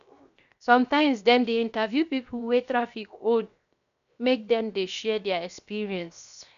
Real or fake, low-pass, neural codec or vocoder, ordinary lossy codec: fake; 7.2 kHz; codec, 16 kHz, 0.7 kbps, FocalCodec; none